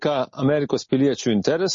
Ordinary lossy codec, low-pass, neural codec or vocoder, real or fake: MP3, 32 kbps; 7.2 kHz; none; real